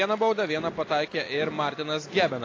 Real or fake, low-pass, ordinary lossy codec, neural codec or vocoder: real; 7.2 kHz; AAC, 32 kbps; none